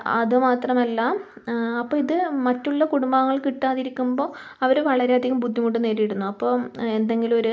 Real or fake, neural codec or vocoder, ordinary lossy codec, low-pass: real; none; none; none